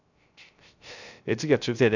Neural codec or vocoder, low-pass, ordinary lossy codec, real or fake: codec, 16 kHz, 0.3 kbps, FocalCodec; 7.2 kHz; none; fake